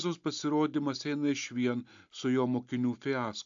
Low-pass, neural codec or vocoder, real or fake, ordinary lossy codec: 7.2 kHz; none; real; AAC, 64 kbps